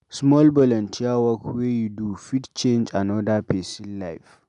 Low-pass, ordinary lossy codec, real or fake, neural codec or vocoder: 10.8 kHz; none; real; none